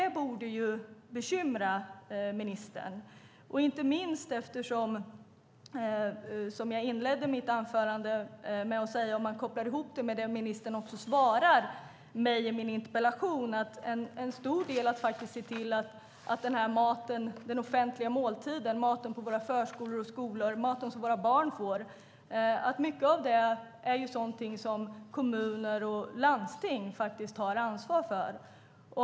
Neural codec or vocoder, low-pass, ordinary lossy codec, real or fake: none; none; none; real